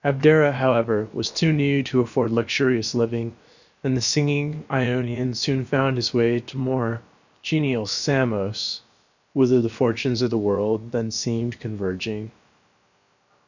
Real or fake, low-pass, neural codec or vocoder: fake; 7.2 kHz; codec, 16 kHz, about 1 kbps, DyCAST, with the encoder's durations